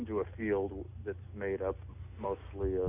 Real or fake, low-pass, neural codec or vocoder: real; 3.6 kHz; none